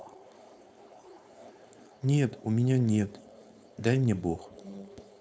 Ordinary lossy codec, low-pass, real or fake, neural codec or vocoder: none; none; fake; codec, 16 kHz, 4.8 kbps, FACodec